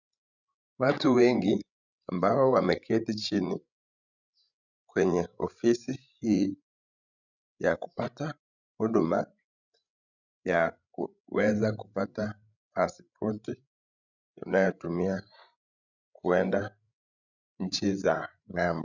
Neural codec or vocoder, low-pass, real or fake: codec, 16 kHz, 16 kbps, FreqCodec, larger model; 7.2 kHz; fake